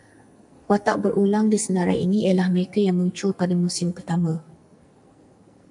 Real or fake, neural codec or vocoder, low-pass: fake; codec, 44.1 kHz, 2.6 kbps, SNAC; 10.8 kHz